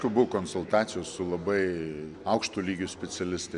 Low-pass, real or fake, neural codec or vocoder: 10.8 kHz; real; none